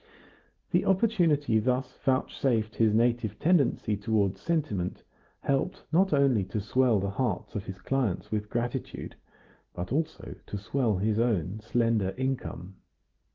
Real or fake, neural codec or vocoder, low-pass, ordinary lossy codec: real; none; 7.2 kHz; Opus, 16 kbps